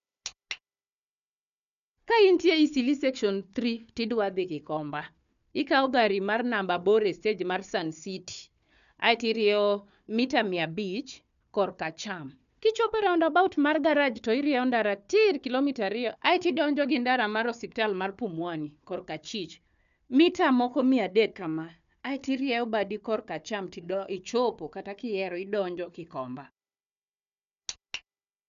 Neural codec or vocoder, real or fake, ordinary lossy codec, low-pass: codec, 16 kHz, 4 kbps, FunCodec, trained on Chinese and English, 50 frames a second; fake; none; 7.2 kHz